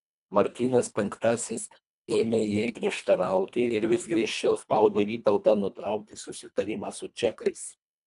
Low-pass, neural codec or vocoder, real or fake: 10.8 kHz; codec, 24 kHz, 1.5 kbps, HILCodec; fake